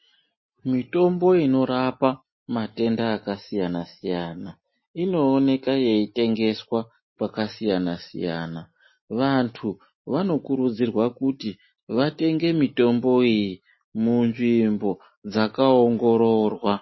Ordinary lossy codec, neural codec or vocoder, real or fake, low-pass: MP3, 24 kbps; none; real; 7.2 kHz